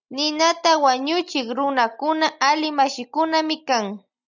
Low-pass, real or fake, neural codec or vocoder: 7.2 kHz; real; none